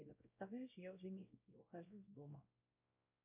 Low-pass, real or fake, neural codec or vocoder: 3.6 kHz; fake; codec, 16 kHz, 0.5 kbps, X-Codec, WavLM features, trained on Multilingual LibriSpeech